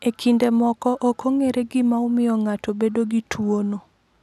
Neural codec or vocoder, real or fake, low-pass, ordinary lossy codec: none; real; 14.4 kHz; none